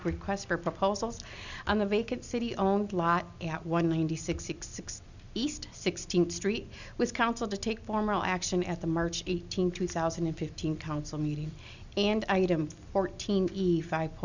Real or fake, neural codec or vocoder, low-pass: real; none; 7.2 kHz